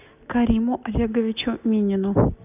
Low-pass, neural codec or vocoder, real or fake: 3.6 kHz; none; real